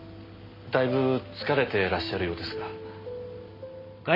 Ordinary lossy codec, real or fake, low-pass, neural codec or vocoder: MP3, 24 kbps; real; 5.4 kHz; none